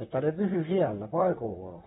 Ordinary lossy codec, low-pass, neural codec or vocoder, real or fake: AAC, 16 kbps; 19.8 kHz; vocoder, 44.1 kHz, 128 mel bands, Pupu-Vocoder; fake